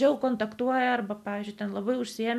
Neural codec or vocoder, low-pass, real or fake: none; 14.4 kHz; real